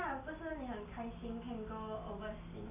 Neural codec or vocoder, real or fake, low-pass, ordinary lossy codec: none; real; 3.6 kHz; none